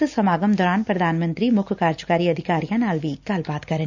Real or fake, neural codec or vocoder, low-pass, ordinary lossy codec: real; none; 7.2 kHz; none